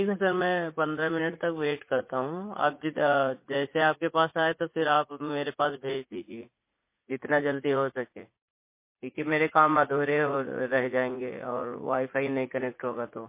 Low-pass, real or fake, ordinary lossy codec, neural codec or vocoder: 3.6 kHz; fake; MP3, 24 kbps; vocoder, 44.1 kHz, 80 mel bands, Vocos